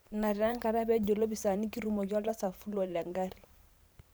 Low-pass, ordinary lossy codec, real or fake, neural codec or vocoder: none; none; fake; vocoder, 44.1 kHz, 128 mel bands every 512 samples, BigVGAN v2